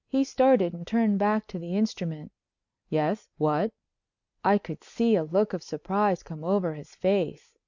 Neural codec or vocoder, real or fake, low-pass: none; real; 7.2 kHz